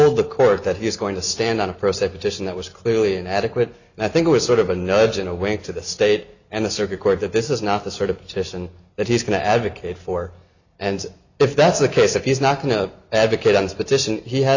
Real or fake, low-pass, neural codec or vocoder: real; 7.2 kHz; none